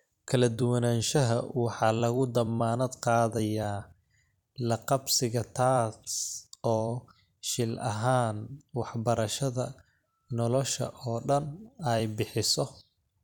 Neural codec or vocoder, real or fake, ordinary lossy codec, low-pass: vocoder, 44.1 kHz, 128 mel bands every 256 samples, BigVGAN v2; fake; none; 19.8 kHz